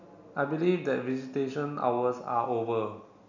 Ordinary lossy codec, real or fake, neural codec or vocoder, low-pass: none; real; none; 7.2 kHz